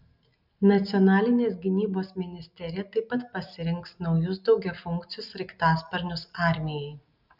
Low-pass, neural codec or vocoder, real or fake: 5.4 kHz; none; real